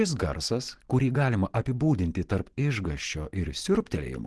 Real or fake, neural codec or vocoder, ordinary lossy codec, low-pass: real; none; Opus, 16 kbps; 10.8 kHz